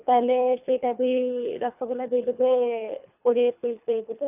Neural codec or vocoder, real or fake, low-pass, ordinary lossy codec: codec, 24 kHz, 3 kbps, HILCodec; fake; 3.6 kHz; none